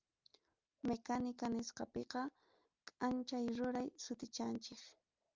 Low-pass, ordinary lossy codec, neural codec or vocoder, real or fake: 7.2 kHz; Opus, 24 kbps; none; real